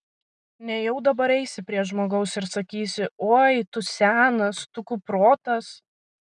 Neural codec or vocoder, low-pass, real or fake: none; 9.9 kHz; real